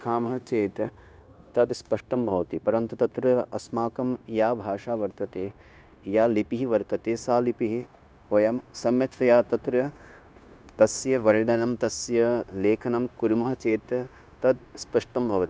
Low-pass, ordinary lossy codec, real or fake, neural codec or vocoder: none; none; fake; codec, 16 kHz, 0.9 kbps, LongCat-Audio-Codec